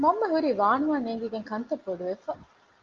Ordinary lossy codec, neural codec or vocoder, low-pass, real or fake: Opus, 32 kbps; none; 7.2 kHz; real